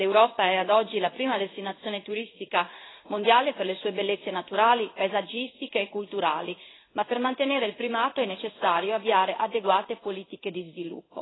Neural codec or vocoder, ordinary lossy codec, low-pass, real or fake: codec, 16 kHz in and 24 kHz out, 1 kbps, XY-Tokenizer; AAC, 16 kbps; 7.2 kHz; fake